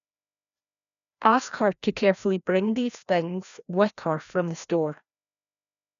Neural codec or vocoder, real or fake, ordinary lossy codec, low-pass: codec, 16 kHz, 1 kbps, FreqCodec, larger model; fake; none; 7.2 kHz